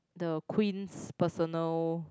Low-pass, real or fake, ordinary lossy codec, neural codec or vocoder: none; real; none; none